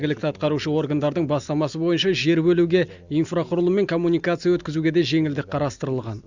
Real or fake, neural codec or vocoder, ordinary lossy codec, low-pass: real; none; Opus, 64 kbps; 7.2 kHz